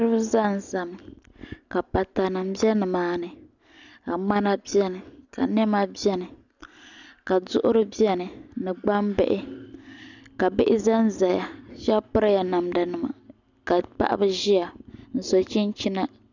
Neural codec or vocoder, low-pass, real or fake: none; 7.2 kHz; real